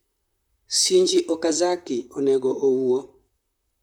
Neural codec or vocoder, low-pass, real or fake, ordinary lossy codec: vocoder, 44.1 kHz, 128 mel bands, Pupu-Vocoder; 19.8 kHz; fake; none